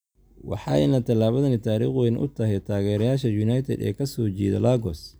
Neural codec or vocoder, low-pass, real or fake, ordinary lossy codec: none; none; real; none